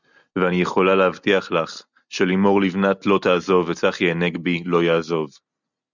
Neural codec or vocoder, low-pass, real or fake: none; 7.2 kHz; real